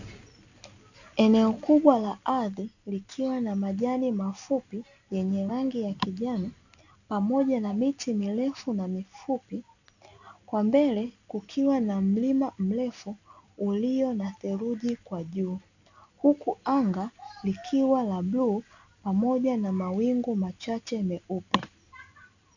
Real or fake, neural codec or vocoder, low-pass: real; none; 7.2 kHz